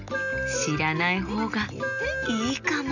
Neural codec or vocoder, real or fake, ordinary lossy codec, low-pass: none; real; none; 7.2 kHz